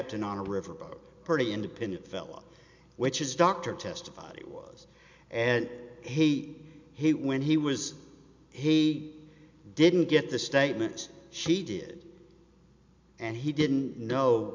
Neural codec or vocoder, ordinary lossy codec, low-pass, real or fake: none; MP3, 64 kbps; 7.2 kHz; real